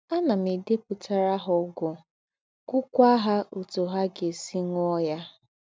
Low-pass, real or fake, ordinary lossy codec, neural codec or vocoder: none; real; none; none